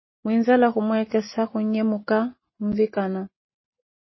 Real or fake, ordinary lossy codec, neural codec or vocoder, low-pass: real; MP3, 24 kbps; none; 7.2 kHz